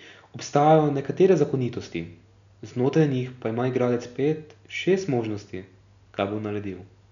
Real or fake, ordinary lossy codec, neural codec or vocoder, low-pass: real; none; none; 7.2 kHz